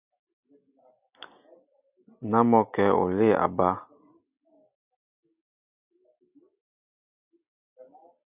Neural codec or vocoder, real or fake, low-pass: none; real; 3.6 kHz